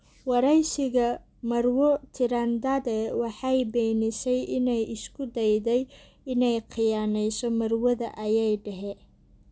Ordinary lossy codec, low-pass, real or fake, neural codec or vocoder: none; none; real; none